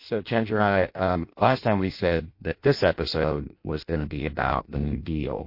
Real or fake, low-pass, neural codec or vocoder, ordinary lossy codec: fake; 5.4 kHz; codec, 16 kHz in and 24 kHz out, 0.6 kbps, FireRedTTS-2 codec; MP3, 32 kbps